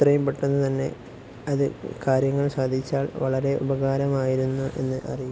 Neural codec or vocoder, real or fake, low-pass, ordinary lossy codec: none; real; none; none